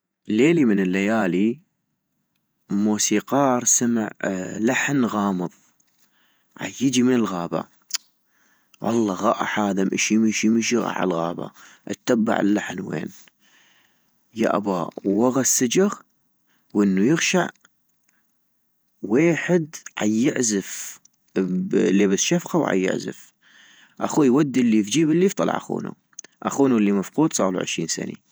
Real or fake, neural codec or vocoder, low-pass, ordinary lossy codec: fake; vocoder, 48 kHz, 128 mel bands, Vocos; none; none